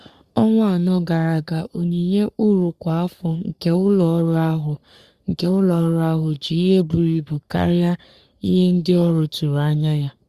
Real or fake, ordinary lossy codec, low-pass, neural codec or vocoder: fake; Opus, 64 kbps; 14.4 kHz; codec, 44.1 kHz, 3.4 kbps, Pupu-Codec